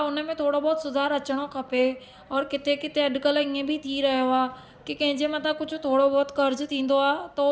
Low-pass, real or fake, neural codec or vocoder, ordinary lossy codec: none; real; none; none